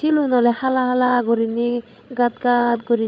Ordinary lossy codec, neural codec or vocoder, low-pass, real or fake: none; codec, 16 kHz, 16 kbps, FreqCodec, larger model; none; fake